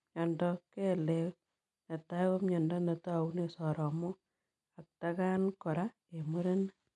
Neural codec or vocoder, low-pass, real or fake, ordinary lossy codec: none; 10.8 kHz; real; none